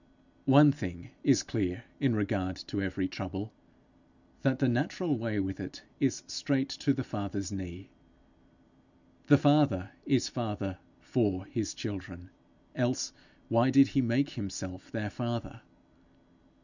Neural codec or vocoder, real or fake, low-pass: none; real; 7.2 kHz